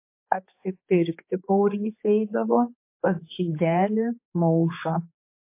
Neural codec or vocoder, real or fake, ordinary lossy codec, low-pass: codec, 16 kHz, 4 kbps, X-Codec, HuBERT features, trained on general audio; fake; MP3, 24 kbps; 3.6 kHz